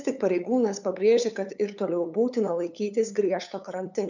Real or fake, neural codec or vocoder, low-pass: fake; codec, 16 kHz, 8 kbps, FunCodec, trained on LibriTTS, 25 frames a second; 7.2 kHz